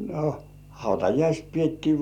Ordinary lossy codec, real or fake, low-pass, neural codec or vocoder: none; real; 19.8 kHz; none